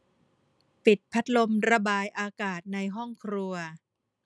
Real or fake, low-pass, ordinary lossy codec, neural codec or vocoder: real; none; none; none